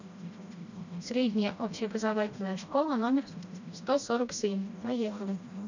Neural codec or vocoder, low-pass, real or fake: codec, 16 kHz, 1 kbps, FreqCodec, smaller model; 7.2 kHz; fake